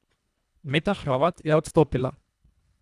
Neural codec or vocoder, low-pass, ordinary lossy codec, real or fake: codec, 24 kHz, 1.5 kbps, HILCodec; none; none; fake